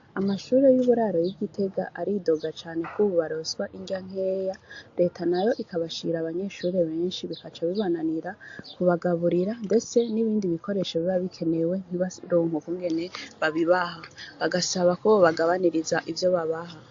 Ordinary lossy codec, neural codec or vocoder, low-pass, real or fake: AAC, 48 kbps; none; 7.2 kHz; real